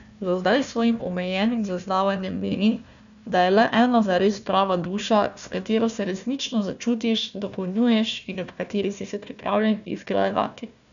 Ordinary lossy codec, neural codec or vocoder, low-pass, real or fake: none; codec, 16 kHz, 1 kbps, FunCodec, trained on Chinese and English, 50 frames a second; 7.2 kHz; fake